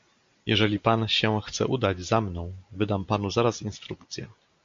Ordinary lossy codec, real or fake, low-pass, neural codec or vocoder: MP3, 48 kbps; real; 7.2 kHz; none